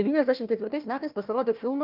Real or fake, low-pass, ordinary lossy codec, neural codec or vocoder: fake; 5.4 kHz; Opus, 24 kbps; codec, 16 kHz, 1 kbps, FunCodec, trained on Chinese and English, 50 frames a second